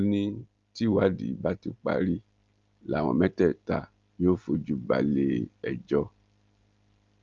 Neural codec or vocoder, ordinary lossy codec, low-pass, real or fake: none; Opus, 32 kbps; 7.2 kHz; real